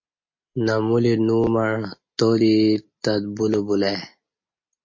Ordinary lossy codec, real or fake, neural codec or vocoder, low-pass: MP3, 32 kbps; real; none; 7.2 kHz